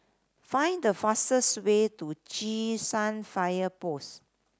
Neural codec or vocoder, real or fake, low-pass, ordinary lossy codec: none; real; none; none